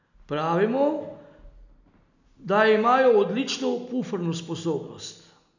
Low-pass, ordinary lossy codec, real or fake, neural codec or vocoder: 7.2 kHz; none; real; none